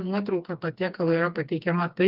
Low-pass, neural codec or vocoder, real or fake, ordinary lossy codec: 5.4 kHz; codec, 16 kHz, 2 kbps, FreqCodec, smaller model; fake; Opus, 24 kbps